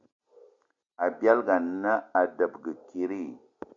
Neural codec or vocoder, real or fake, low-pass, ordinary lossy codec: none; real; 7.2 kHz; MP3, 64 kbps